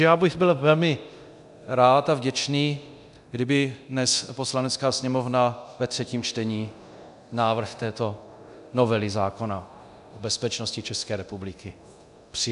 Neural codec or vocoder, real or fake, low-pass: codec, 24 kHz, 0.9 kbps, DualCodec; fake; 10.8 kHz